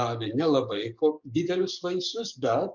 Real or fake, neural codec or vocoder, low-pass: fake; codec, 44.1 kHz, 7.8 kbps, DAC; 7.2 kHz